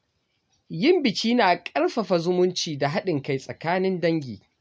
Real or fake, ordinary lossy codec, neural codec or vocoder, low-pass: real; none; none; none